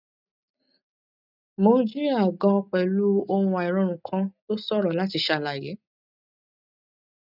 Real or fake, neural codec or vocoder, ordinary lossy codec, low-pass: real; none; none; 5.4 kHz